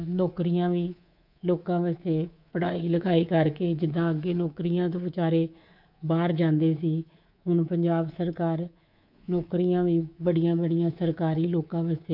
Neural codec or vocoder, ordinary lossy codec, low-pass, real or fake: codec, 24 kHz, 3.1 kbps, DualCodec; none; 5.4 kHz; fake